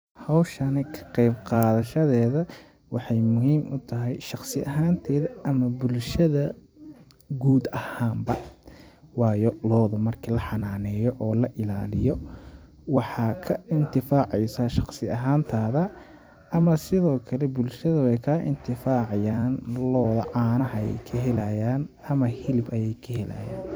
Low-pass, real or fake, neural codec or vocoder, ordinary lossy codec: none; fake; vocoder, 44.1 kHz, 128 mel bands every 256 samples, BigVGAN v2; none